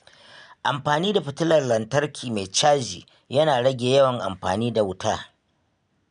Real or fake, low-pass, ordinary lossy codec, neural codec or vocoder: real; 9.9 kHz; none; none